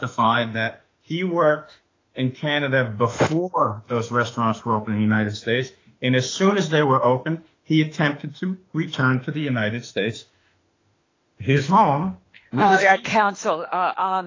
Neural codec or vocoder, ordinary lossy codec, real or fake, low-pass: autoencoder, 48 kHz, 32 numbers a frame, DAC-VAE, trained on Japanese speech; AAC, 32 kbps; fake; 7.2 kHz